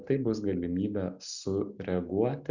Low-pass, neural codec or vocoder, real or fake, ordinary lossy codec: 7.2 kHz; none; real; Opus, 64 kbps